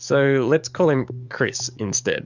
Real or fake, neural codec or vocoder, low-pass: fake; codec, 44.1 kHz, 7.8 kbps, DAC; 7.2 kHz